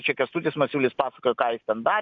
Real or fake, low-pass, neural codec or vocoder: real; 7.2 kHz; none